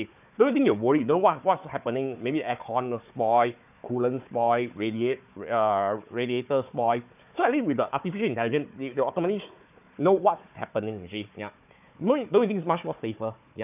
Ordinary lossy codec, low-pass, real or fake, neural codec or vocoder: none; 3.6 kHz; fake; codec, 16 kHz, 4 kbps, FunCodec, trained on Chinese and English, 50 frames a second